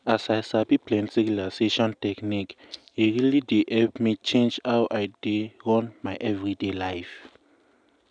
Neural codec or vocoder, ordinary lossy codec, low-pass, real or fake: none; none; 9.9 kHz; real